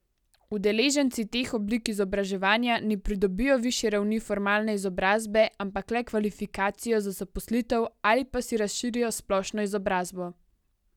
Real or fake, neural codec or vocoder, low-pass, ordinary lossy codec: real; none; 19.8 kHz; none